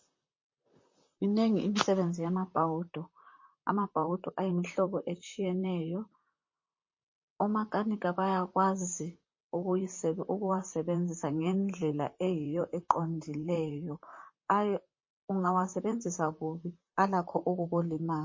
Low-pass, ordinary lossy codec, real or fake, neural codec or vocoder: 7.2 kHz; MP3, 32 kbps; fake; vocoder, 44.1 kHz, 128 mel bands, Pupu-Vocoder